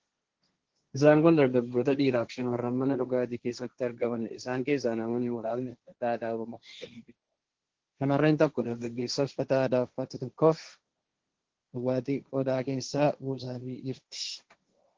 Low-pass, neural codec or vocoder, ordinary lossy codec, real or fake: 7.2 kHz; codec, 16 kHz, 1.1 kbps, Voila-Tokenizer; Opus, 16 kbps; fake